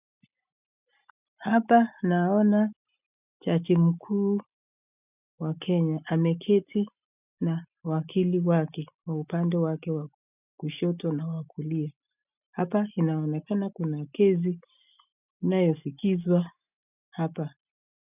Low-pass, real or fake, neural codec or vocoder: 3.6 kHz; real; none